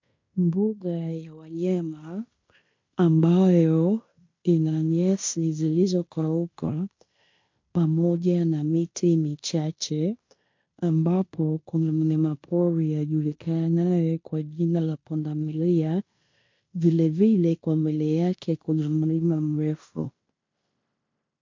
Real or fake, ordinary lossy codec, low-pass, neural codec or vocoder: fake; MP3, 48 kbps; 7.2 kHz; codec, 16 kHz in and 24 kHz out, 0.9 kbps, LongCat-Audio-Codec, fine tuned four codebook decoder